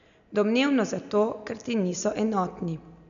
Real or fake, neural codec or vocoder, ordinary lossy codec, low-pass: real; none; none; 7.2 kHz